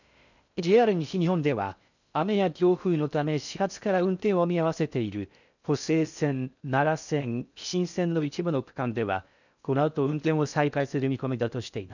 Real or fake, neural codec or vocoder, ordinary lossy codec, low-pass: fake; codec, 16 kHz in and 24 kHz out, 0.6 kbps, FocalCodec, streaming, 4096 codes; none; 7.2 kHz